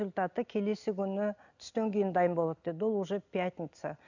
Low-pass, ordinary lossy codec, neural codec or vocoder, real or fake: 7.2 kHz; none; none; real